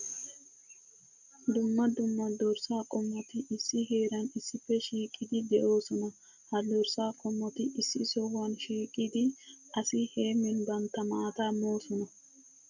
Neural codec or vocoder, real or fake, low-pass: none; real; 7.2 kHz